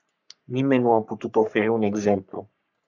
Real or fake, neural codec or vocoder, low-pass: fake; codec, 44.1 kHz, 3.4 kbps, Pupu-Codec; 7.2 kHz